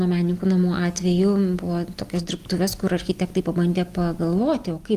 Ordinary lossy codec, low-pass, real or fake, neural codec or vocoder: Opus, 24 kbps; 14.4 kHz; real; none